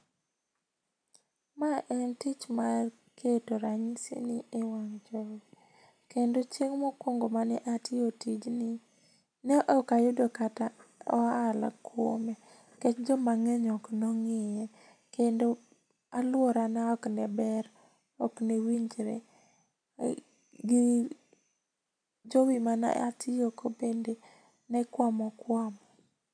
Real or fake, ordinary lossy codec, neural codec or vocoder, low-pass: real; none; none; 9.9 kHz